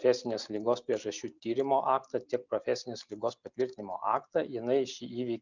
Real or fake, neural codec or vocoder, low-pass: real; none; 7.2 kHz